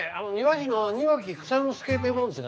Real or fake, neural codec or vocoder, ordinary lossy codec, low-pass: fake; codec, 16 kHz, 4 kbps, X-Codec, HuBERT features, trained on general audio; none; none